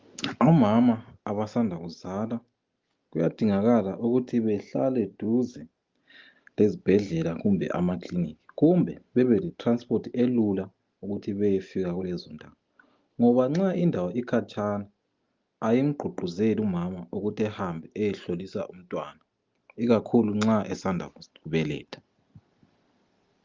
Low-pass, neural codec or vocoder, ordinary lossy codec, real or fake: 7.2 kHz; none; Opus, 32 kbps; real